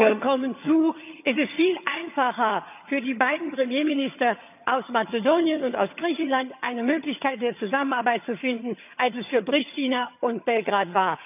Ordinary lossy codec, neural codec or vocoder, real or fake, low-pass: none; vocoder, 22.05 kHz, 80 mel bands, HiFi-GAN; fake; 3.6 kHz